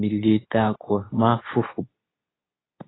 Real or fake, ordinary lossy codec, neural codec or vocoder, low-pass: fake; AAC, 16 kbps; codec, 24 kHz, 0.9 kbps, WavTokenizer, medium speech release version 2; 7.2 kHz